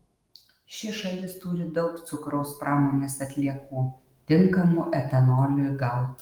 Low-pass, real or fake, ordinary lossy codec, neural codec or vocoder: 19.8 kHz; fake; Opus, 24 kbps; autoencoder, 48 kHz, 128 numbers a frame, DAC-VAE, trained on Japanese speech